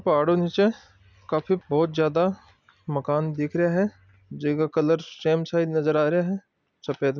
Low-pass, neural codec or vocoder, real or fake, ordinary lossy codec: 7.2 kHz; none; real; none